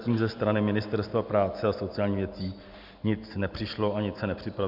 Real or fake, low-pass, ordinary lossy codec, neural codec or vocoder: real; 5.4 kHz; MP3, 48 kbps; none